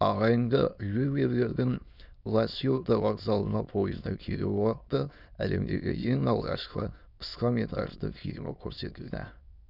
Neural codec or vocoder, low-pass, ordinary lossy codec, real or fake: autoencoder, 22.05 kHz, a latent of 192 numbers a frame, VITS, trained on many speakers; 5.4 kHz; none; fake